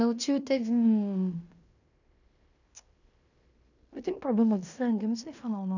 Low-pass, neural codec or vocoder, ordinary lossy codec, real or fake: 7.2 kHz; codec, 16 kHz in and 24 kHz out, 0.9 kbps, LongCat-Audio-Codec, fine tuned four codebook decoder; none; fake